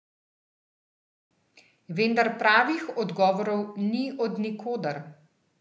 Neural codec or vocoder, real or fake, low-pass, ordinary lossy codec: none; real; none; none